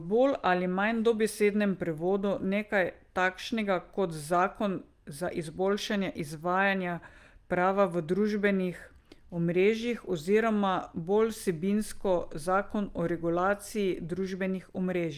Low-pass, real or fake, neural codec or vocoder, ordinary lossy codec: 14.4 kHz; real; none; Opus, 32 kbps